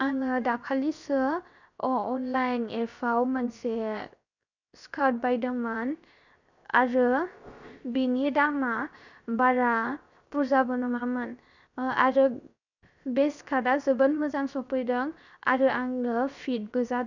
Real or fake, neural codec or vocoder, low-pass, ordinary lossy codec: fake; codec, 16 kHz, 0.7 kbps, FocalCodec; 7.2 kHz; none